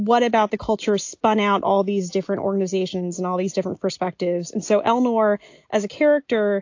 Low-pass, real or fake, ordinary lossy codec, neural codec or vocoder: 7.2 kHz; real; AAC, 48 kbps; none